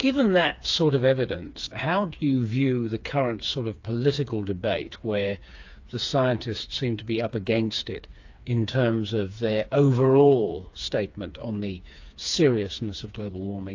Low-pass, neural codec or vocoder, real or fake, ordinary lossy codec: 7.2 kHz; codec, 16 kHz, 4 kbps, FreqCodec, smaller model; fake; AAC, 48 kbps